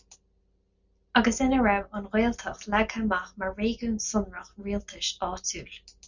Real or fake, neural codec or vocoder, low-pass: real; none; 7.2 kHz